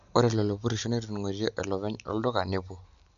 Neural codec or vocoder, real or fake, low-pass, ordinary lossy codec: none; real; 7.2 kHz; none